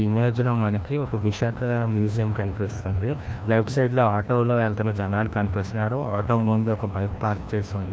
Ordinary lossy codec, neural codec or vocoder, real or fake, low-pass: none; codec, 16 kHz, 1 kbps, FreqCodec, larger model; fake; none